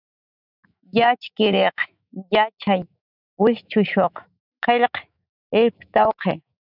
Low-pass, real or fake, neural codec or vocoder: 5.4 kHz; fake; autoencoder, 48 kHz, 128 numbers a frame, DAC-VAE, trained on Japanese speech